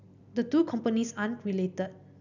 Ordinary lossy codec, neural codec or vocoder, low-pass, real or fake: none; none; 7.2 kHz; real